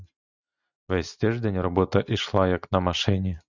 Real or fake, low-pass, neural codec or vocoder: real; 7.2 kHz; none